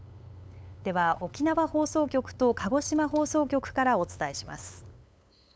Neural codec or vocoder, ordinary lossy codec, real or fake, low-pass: codec, 16 kHz, 8 kbps, FunCodec, trained on LibriTTS, 25 frames a second; none; fake; none